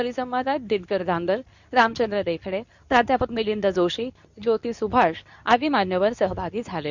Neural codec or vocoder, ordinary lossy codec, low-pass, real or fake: codec, 24 kHz, 0.9 kbps, WavTokenizer, medium speech release version 2; none; 7.2 kHz; fake